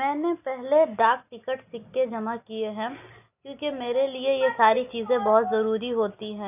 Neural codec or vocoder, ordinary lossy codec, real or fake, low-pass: none; none; real; 3.6 kHz